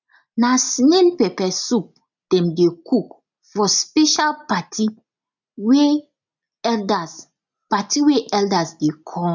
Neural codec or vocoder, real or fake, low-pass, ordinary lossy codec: none; real; 7.2 kHz; none